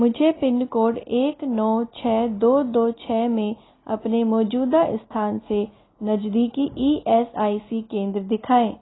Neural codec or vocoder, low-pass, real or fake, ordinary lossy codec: none; 7.2 kHz; real; AAC, 16 kbps